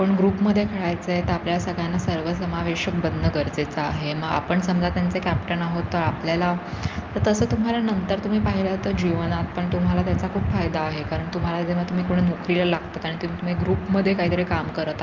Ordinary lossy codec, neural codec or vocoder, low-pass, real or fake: Opus, 32 kbps; none; 7.2 kHz; real